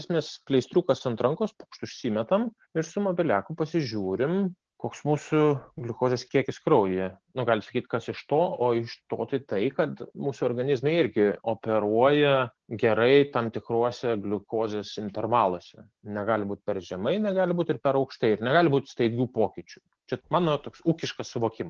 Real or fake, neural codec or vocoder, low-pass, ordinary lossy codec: real; none; 7.2 kHz; Opus, 16 kbps